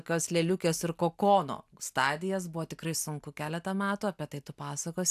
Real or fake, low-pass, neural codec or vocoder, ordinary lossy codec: real; 14.4 kHz; none; AAC, 96 kbps